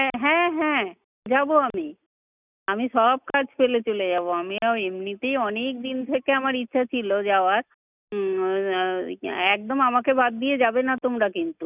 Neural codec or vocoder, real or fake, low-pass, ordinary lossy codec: none; real; 3.6 kHz; none